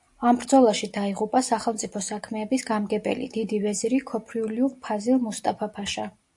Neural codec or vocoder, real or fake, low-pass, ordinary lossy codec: none; real; 10.8 kHz; AAC, 64 kbps